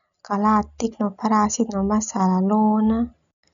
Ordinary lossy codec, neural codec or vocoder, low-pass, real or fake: none; none; 7.2 kHz; real